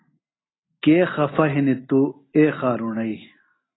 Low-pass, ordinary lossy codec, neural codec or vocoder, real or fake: 7.2 kHz; AAC, 16 kbps; none; real